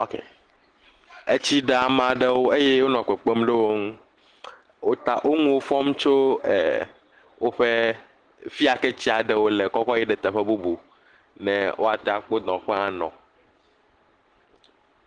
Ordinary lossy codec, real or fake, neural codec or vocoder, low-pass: Opus, 16 kbps; real; none; 9.9 kHz